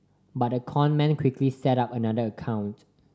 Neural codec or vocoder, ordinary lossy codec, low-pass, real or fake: none; none; none; real